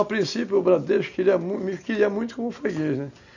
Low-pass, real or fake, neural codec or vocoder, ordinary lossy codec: 7.2 kHz; real; none; none